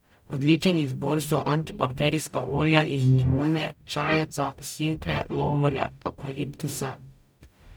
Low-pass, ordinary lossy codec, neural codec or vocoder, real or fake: none; none; codec, 44.1 kHz, 0.9 kbps, DAC; fake